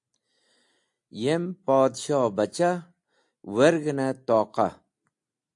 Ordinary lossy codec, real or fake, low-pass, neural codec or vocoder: AAC, 64 kbps; real; 10.8 kHz; none